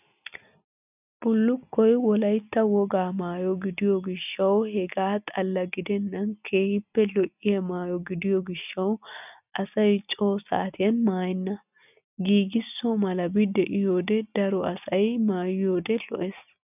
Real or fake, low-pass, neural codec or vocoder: real; 3.6 kHz; none